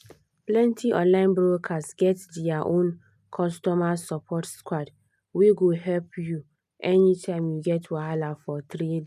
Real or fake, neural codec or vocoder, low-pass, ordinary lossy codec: real; none; 14.4 kHz; none